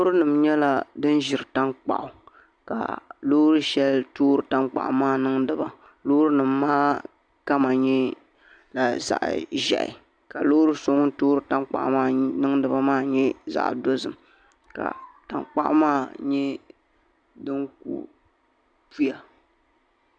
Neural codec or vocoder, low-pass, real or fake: none; 9.9 kHz; real